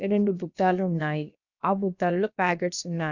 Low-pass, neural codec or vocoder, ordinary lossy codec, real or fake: 7.2 kHz; codec, 16 kHz, about 1 kbps, DyCAST, with the encoder's durations; none; fake